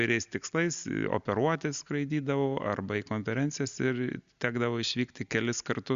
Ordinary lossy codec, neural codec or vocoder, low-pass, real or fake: Opus, 64 kbps; none; 7.2 kHz; real